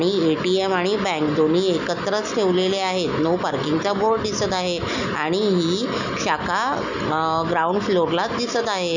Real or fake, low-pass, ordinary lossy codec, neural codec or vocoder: real; 7.2 kHz; none; none